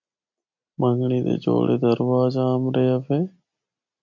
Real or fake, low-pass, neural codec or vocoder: real; 7.2 kHz; none